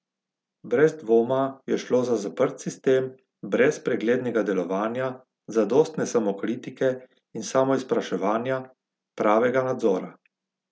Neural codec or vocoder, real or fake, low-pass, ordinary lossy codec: none; real; none; none